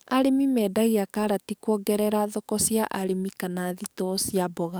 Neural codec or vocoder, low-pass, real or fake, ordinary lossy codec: codec, 44.1 kHz, 7.8 kbps, DAC; none; fake; none